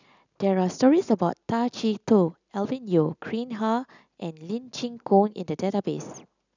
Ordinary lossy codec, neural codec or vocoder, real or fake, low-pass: none; none; real; 7.2 kHz